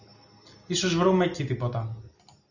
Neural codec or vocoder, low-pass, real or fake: none; 7.2 kHz; real